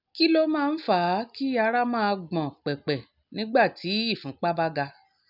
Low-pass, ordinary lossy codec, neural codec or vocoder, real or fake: 5.4 kHz; none; none; real